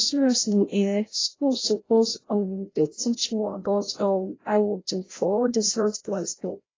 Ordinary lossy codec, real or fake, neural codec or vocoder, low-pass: AAC, 32 kbps; fake; codec, 16 kHz, 0.5 kbps, FreqCodec, larger model; 7.2 kHz